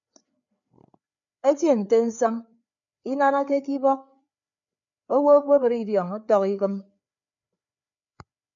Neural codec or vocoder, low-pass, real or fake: codec, 16 kHz, 4 kbps, FreqCodec, larger model; 7.2 kHz; fake